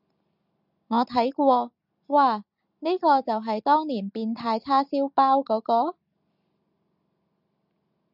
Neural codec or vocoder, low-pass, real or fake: none; 5.4 kHz; real